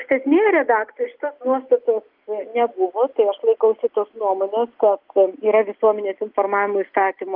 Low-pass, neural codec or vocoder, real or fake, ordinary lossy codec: 5.4 kHz; none; real; Opus, 24 kbps